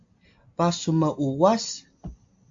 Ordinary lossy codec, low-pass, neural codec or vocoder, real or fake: MP3, 48 kbps; 7.2 kHz; none; real